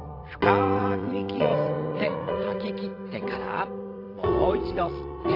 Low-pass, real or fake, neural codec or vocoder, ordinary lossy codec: 5.4 kHz; fake; vocoder, 22.05 kHz, 80 mel bands, WaveNeXt; AAC, 32 kbps